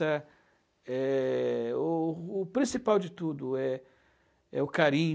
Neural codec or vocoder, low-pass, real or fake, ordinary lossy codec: none; none; real; none